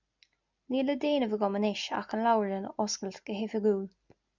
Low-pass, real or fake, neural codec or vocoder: 7.2 kHz; real; none